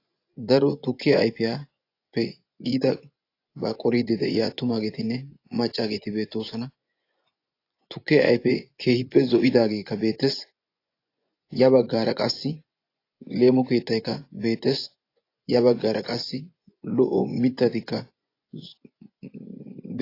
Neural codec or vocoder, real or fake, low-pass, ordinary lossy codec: vocoder, 24 kHz, 100 mel bands, Vocos; fake; 5.4 kHz; AAC, 32 kbps